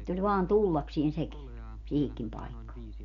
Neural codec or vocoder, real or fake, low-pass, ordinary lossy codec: none; real; 7.2 kHz; none